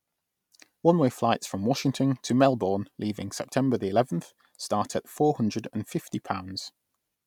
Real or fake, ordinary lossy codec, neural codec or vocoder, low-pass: real; none; none; 19.8 kHz